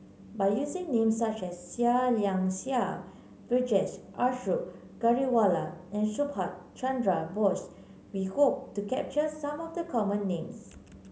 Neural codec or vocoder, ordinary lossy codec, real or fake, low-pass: none; none; real; none